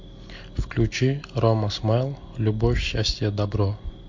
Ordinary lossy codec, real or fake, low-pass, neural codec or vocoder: MP3, 48 kbps; real; 7.2 kHz; none